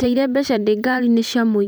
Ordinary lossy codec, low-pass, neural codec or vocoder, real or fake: none; none; vocoder, 44.1 kHz, 128 mel bands every 512 samples, BigVGAN v2; fake